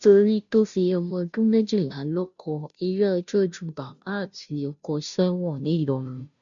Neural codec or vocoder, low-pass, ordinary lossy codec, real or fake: codec, 16 kHz, 0.5 kbps, FunCodec, trained on Chinese and English, 25 frames a second; 7.2 kHz; none; fake